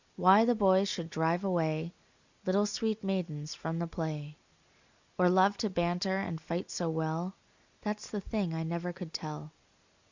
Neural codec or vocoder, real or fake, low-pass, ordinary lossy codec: none; real; 7.2 kHz; Opus, 64 kbps